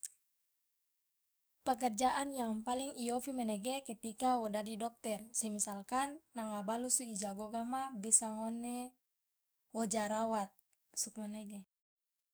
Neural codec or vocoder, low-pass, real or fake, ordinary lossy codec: codec, 44.1 kHz, 7.8 kbps, DAC; none; fake; none